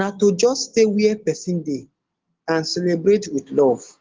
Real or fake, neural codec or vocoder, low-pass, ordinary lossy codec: real; none; 7.2 kHz; Opus, 16 kbps